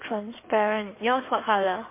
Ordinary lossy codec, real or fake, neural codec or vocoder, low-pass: MP3, 24 kbps; fake; codec, 16 kHz in and 24 kHz out, 2.2 kbps, FireRedTTS-2 codec; 3.6 kHz